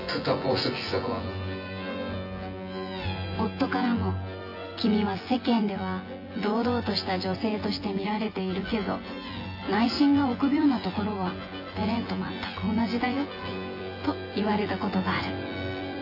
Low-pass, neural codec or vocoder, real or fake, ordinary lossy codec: 5.4 kHz; vocoder, 24 kHz, 100 mel bands, Vocos; fake; AAC, 32 kbps